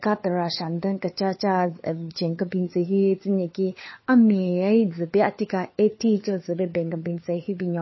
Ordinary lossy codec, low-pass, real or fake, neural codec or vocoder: MP3, 24 kbps; 7.2 kHz; fake; codec, 16 kHz, 4 kbps, FunCodec, trained on LibriTTS, 50 frames a second